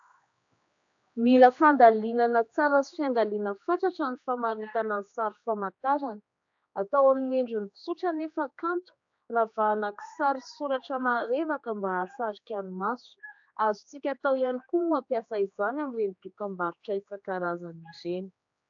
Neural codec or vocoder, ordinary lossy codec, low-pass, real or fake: codec, 16 kHz, 2 kbps, X-Codec, HuBERT features, trained on general audio; AAC, 64 kbps; 7.2 kHz; fake